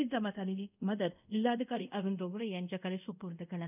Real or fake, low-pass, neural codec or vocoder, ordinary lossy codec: fake; 3.6 kHz; codec, 24 kHz, 0.5 kbps, DualCodec; none